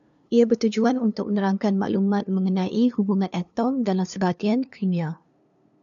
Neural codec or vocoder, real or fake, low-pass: codec, 16 kHz, 2 kbps, FunCodec, trained on LibriTTS, 25 frames a second; fake; 7.2 kHz